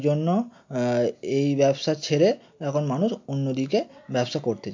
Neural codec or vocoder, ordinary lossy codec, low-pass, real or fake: none; MP3, 48 kbps; 7.2 kHz; real